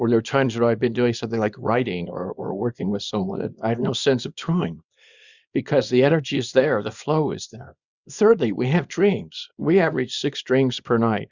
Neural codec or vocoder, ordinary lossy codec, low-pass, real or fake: codec, 24 kHz, 0.9 kbps, WavTokenizer, small release; Opus, 64 kbps; 7.2 kHz; fake